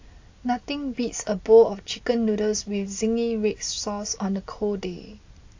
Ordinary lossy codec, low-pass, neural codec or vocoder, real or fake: AAC, 48 kbps; 7.2 kHz; none; real